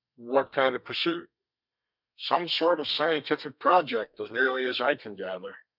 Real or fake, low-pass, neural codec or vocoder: fake; 5.4 kHz; codec, 32 kHz, 1.9 kbps, SNAC